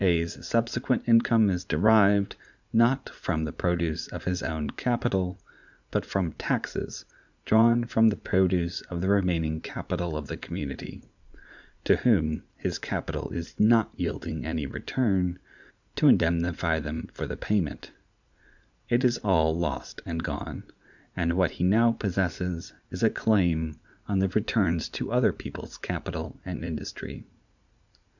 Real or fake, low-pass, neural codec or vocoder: fake; 7.2 kHz; vocoder, 44.1 kHz, 80 mel bands, Vocos